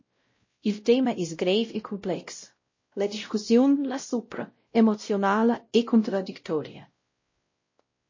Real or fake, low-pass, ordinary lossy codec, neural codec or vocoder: fake; 7.2 kHz; MP3, 32 kbps; codec, 16 kHz, 0.5 kbps, X-Codec, WavLM features, trained on Multilingual LibriSpeech